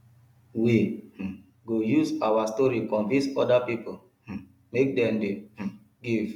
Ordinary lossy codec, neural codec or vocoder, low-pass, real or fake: MP3, 96 kbps; none; 19.8 kHz; real